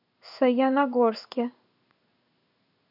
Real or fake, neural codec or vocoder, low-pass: fake; codec, 16 kHz in and 24 kHz out, 1 kbps, XY-Tokenizer; 5.4 kHz